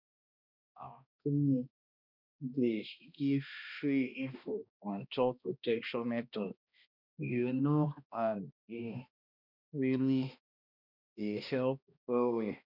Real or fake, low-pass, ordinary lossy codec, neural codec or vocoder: fake; 5.4 kHz; none; codec, 16 kHz, 1 kbps, X-Codec, HuBERT features, trained on balanced general audio